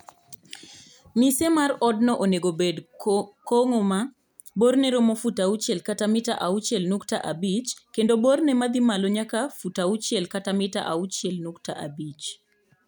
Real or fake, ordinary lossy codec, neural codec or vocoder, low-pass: real; none; none; none